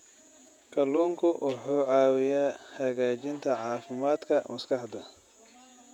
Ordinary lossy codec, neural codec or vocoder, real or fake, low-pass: none; vocoder, 48 kHz, 128 mel bands, Vocos; fake; 19.8 kHz